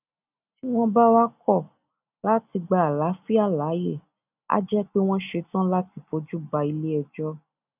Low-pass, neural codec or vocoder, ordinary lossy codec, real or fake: 3.6 kHz; none; none; real